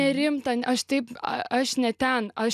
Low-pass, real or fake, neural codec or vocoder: 14.4 kHz; real; none